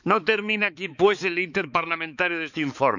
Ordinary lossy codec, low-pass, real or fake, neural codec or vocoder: none; 7.2 kHz; fake; codec, 16 kHz, 4 kbps, X-Codec, HuBERT features, trained on balanced general audio